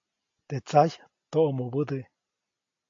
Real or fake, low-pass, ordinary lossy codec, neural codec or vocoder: real; 7.2 kHz; AAC, 48 kbps; none